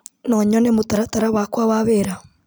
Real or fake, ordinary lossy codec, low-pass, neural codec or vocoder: real; none; none; none